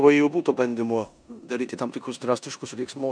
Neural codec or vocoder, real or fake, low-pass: codec, 16 kHz in and 24 kHz out, 0.9 kbps, LongCat-Audio-Codec, four codebook decoder; fake; 9.9 kHz